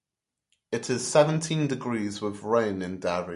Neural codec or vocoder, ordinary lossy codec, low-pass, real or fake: none; MP3, 48 kbps; 14.4 kHz; real